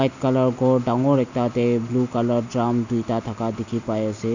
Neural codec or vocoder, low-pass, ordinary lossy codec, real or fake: none; 7.2 kHz; none; real